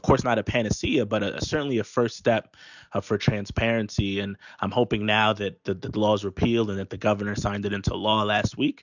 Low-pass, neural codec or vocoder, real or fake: 7.2 kHz; none; real